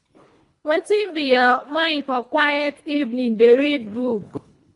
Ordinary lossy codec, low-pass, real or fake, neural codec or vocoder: AAC, 48 kbps; 10.8 kHz; fake; codec, 24 kHz, 1.5 kbps, HILCodec